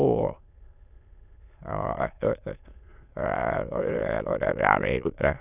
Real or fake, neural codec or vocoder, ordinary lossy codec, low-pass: fake; autoencoder, 22.05 kHz, a latent of 192 numbers a frame, VITS, trained on many speakers; none; 3.6 kHz